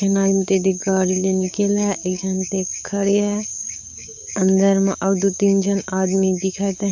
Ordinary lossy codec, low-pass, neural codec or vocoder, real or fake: none; 7.2 kHz; codec, 16 kHz, 6 kbps, DAC; fake